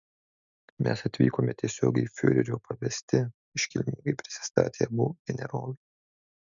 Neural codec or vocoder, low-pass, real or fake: none; 7.2 kHz; real